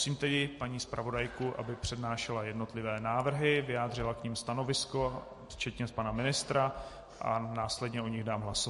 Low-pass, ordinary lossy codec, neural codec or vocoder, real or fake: 14.4 kHz; MP3, 48 kbps; none; real